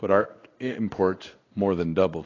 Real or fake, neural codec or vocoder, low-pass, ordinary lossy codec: fake; codec, 16 kHz in and 24 kHz out, 1 kbps, XY-Tokenizer; 7.2 kHz; AAC, 32 kbps